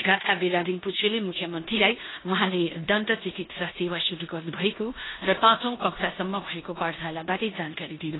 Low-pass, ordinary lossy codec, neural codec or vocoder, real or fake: 7.2 kHz; AAC, 16 kbps; codec, 16 kHz in and 24 kHz out, 0.9 kbps, LongCat-Audio-Codec, four codebook decoder; fake